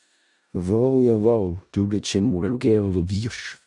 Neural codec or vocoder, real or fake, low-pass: codec, 16 kHz in and 24 kHz out, 0.4 kbps, LongCat-Audio-Codec, four codebook decoder; fake; 10.8 kHz